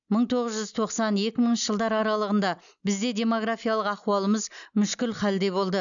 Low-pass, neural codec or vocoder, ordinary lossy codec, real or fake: 7.2 kHz; none; none; real